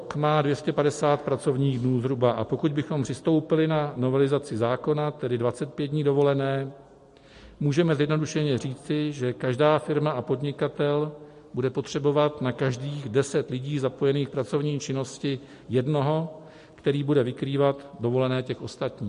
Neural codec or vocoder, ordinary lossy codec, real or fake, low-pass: none; MP3, 48 kbps; real; 14.4 kHz